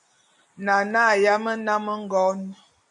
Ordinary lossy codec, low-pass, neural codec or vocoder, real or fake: MP3, 96 kbps; 10.8 kHz; none; real